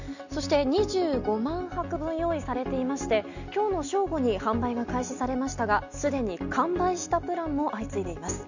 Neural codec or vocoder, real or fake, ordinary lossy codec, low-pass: none; real; none; 7.2 kHz